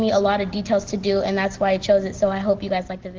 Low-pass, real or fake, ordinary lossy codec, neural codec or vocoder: 7.2 kHz; real; Opus, 16 kbps; none